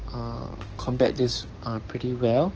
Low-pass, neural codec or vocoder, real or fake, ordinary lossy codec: 7.2 kHz; codec, 16 kHz, 6 kbps, DAC; fake; Opus, 16 kbps